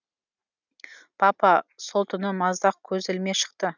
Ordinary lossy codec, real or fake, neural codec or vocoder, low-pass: none; real; none; 7.2 kHz